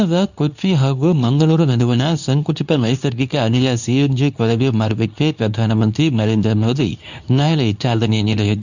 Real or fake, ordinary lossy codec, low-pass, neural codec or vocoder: fake; none; 7.2 kHz; codec, 24 kHz, 0.9 kbps, WavTokenizer, medium speech release version 2